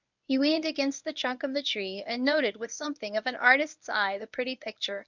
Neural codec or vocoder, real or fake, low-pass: codec, 24 kHz, 0.9 kbps, WavTokenizer, medium speech release version 1; fake; 7.2 kHz